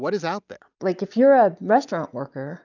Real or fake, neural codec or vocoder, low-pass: real; none; 7.2 kHz